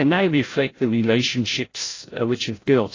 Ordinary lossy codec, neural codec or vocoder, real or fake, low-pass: AAC, 32 kbps; codec, 16 kHz, 0.5 kbps, FreqCodec, larger model; fake; 7.2 kHz